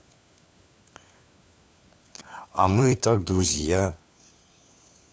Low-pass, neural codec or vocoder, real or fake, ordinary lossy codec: none; codec, 16 kHz, 4 kbps, FunCodec, trained on LibriTTS, 50 frames a second; fake; none